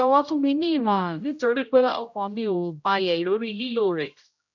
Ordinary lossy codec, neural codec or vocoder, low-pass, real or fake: none; codec, 16 kHz, 0.5 kbps, X-Codec, HuBERT features, trained on general audio; 7.2 kHz; fake